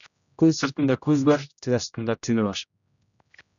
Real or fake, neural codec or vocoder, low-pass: fake; codec, 16 kHz, 0.5 kbps, X-Codec, HuBERT features, trained on general audio; 7.2 kHz